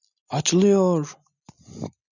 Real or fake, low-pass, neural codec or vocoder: real; 7.2 kHz; none